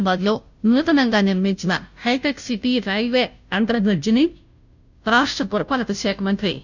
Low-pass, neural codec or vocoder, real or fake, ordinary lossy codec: 7.2 kHz; codec, 16 kHz, 0.5 kbps, FunCodec, trained on Chinese and English, 25 frames a second; fake; none